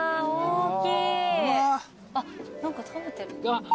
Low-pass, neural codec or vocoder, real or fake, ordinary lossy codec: none; none; real; none